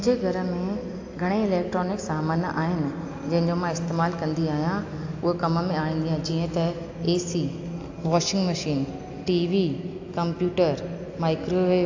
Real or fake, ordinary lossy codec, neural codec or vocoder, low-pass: real; AAC, 48 kbps; none; 7.2 kHz